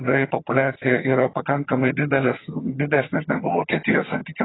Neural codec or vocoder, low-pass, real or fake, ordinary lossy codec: vocoder, 22.05 kHz, 80 mel bands, HiFi-GAN; 7.2 kHz; fake; AAC, 16 kbps